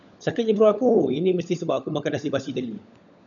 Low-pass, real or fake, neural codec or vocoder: 7.2 kHz; fake; codec, 16 kHz, 16 kbps, FunCodec, trained on LibriTTS, 50 frames a second